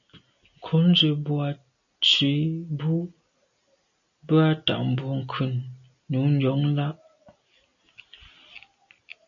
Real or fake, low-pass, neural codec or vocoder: real; 7.2 kHz; none